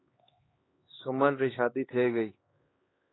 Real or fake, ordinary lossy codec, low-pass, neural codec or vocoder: fake; AAC, 16 kbps; 7.2 kHz; codec, 16 kHz, 2 kbps, X-Codec, HuBERT features, trained on LibriSpeech